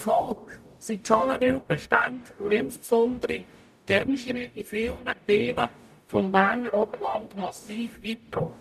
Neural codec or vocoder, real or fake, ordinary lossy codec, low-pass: codec, 44.1 kHz, 0.9 kbps, DAC; fake; none; 14.4 kHz